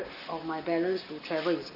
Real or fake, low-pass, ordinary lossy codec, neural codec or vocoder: real; 5.4 kHz; none; none